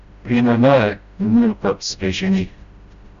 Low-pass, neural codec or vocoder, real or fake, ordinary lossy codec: 7.2 kHz; codec, 16 kHz, 0.5 kbps, FreqCodec, smaller model; fake; Opus, 64 kbps